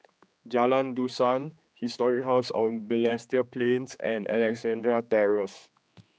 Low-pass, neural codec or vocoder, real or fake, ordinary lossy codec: none; codec, 16 kHz, 2 kbps, X-Codec, HuBERT features, trained on general audio; fake; none